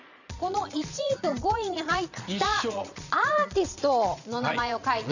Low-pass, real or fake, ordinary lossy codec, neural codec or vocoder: 7.2 kHz; fake; none; vocoder, 22.05 kHz, 80 mel bands, Vocos